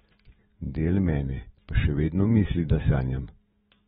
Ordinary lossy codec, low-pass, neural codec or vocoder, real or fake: AAC, 16 kbps; 19.8 kHz; autoencoder, 48 kHz, 128 numbers a frame, DAC-VAE, trained on Japanese speech; fake